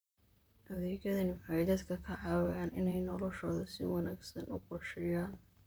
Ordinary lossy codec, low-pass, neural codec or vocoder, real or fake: none; none; vocoder, 44.1 kHz, 128 mel bands, Pupu-Vocoder; fake